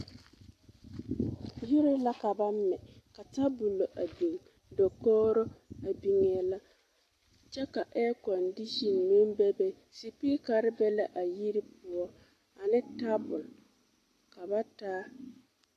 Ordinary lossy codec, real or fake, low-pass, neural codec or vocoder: AAC, 48 kbps; real; 14.4 kHz; none